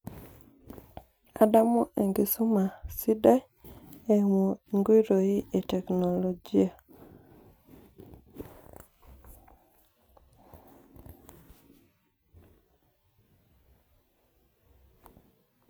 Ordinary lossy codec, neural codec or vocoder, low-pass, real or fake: none; none; none; real